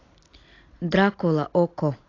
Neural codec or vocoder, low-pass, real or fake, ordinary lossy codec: none; 7.2 kHz; real; AAC, 32 kbps